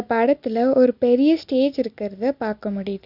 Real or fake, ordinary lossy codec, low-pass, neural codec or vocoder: real; Opus, 64 kbps; 5.4 kHz; none